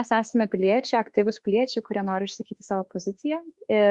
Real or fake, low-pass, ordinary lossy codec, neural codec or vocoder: fake; 10.8 kHz; Opus, 64 kbps; autoencoder, 48 kHz, 32 numbers a frame, DAC-VAE, trained on Japanese speech